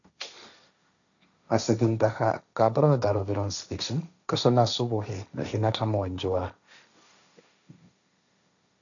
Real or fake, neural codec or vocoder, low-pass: fake; codec, 16 kHz, 1.1 kbps, Voila-Tokenizer; 7.2 kHz